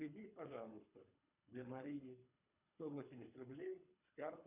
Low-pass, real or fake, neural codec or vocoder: 3.6 kHz; fake; codec, 24 kHz, 3 kbps, HILCodec